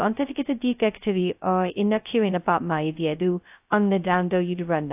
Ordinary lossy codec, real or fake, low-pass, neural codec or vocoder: AAC, 32 kbps; fake; 3.6 kHz; codec, 16 kHz, 0.2 kbps, FocalCodec